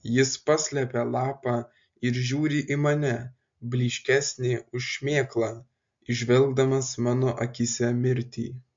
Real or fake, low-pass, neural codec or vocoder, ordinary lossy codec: real; 7.2 kHz; none; MP3, 48 kbps